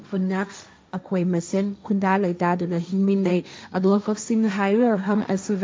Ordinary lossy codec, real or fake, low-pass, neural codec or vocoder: none; fake; none; codec, 16 kHz, 1.1 kbps, Voila-Tokenizer